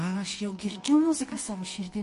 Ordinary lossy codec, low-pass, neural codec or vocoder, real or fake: MP3, 48 kbps; 10.8 kHz; codec, 24 kHz, 0.9 kbps, WavTokenizer, medium music audio release; fake